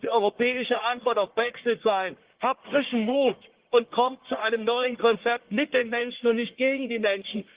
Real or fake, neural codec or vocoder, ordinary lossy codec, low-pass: fake; codec, 44.1 kHz, 1.7 kbps, Pupu-Codec; Opus, 16 kbps; 3.6 kHz